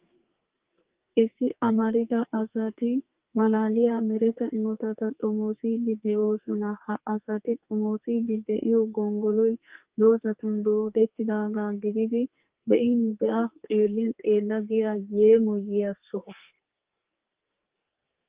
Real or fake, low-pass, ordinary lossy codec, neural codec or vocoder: fake; 3.6 kHz; Opus, 32 kbps; codec, 44.1 kHz, 2.6 kbps, SNAC